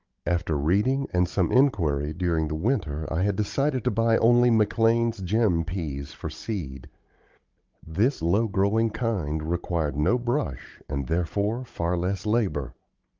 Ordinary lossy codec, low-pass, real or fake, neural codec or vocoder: Opus, 32 kbps; 7.2 kHz; fake; codec, 16 kHz, 16 kbps, FunCodec, trained on Chinese and English, 50 frames a second